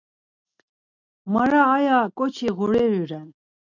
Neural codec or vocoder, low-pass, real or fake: none; 7.2 kHz; real